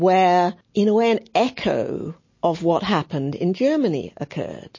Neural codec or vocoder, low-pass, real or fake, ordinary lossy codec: none; 7.2 kHz; real; MP3, 32 kbps